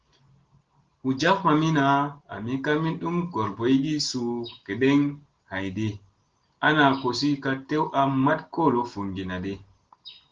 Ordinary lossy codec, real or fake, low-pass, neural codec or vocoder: Opus, 16 kbps; real; 7.2 kHz; none